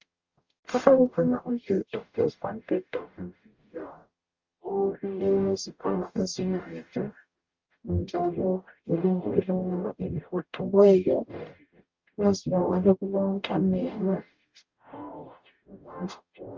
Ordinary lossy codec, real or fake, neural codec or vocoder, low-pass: Opus, 32 kbps; fake; codec, 44.1 kHz, 0.9 kbps, DAC; 7.2 kHz